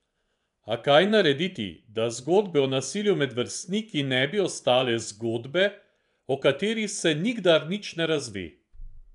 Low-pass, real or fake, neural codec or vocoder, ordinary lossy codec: 10.8 kHz; fake; vocoder, 24 kHz, 100 mel bands, Vocos; none